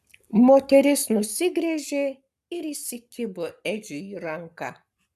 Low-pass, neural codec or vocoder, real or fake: 14.4 kHz; codec, 44.1 kHz, 7.8 kbps, Pupu-Codec; fake